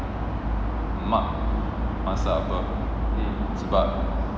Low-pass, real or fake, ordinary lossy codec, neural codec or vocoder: none; real; none; none